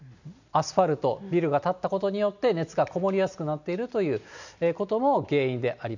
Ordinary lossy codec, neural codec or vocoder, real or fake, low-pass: none; none; real; 7.2 kHz